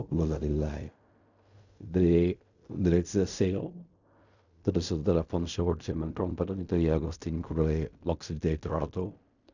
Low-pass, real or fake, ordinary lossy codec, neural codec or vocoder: 7.2 kHz; fake; none; codec, 16 kHz in and 24 kHz out, 0.4 kbps, LongCat-Audio-Codec, fine tuned four codebook decoder